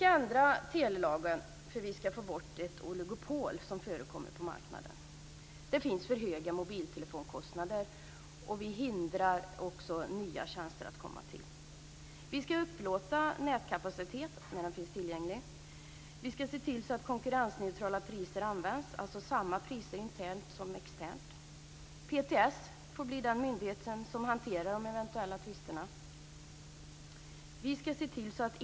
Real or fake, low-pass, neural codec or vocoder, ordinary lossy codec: real; none; none; none